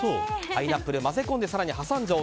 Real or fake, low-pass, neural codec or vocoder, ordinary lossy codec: real; none; none; none